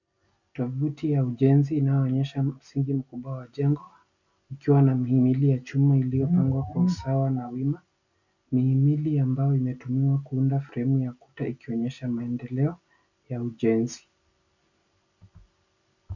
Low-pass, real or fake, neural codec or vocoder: 7.2 kHz; real; none